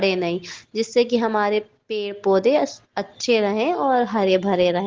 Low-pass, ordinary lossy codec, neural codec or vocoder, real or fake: 7.2 kHz; Opus, 16 kbps; none; real